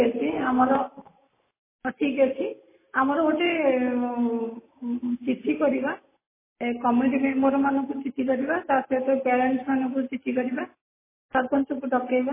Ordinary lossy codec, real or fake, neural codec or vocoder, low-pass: MP3, 16 kbps; real; none; 3.6 kHz